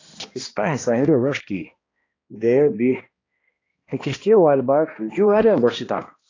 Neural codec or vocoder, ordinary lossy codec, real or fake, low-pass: codec, 16 kHz, 1 kbps, X-Codec, HuBERT features, trained on balanced general audio; AAC, 32 kbps; fake; 7.2 kHz